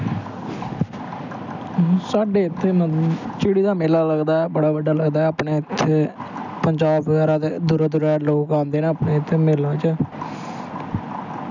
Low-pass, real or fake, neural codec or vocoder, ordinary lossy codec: 7.2 kHz; real; none; none